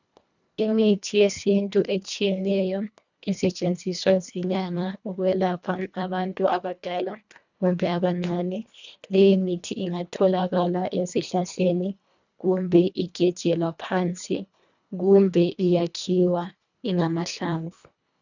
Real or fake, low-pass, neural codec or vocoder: fake; 7.2 kHz; codec, 24 kHz, 1.5 kbps, HILCodec